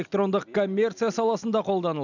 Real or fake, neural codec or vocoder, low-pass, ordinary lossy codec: real; none; 7.2 kHz; none